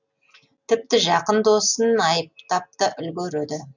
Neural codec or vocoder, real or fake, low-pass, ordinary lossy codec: none; real; 7.2 kHz; none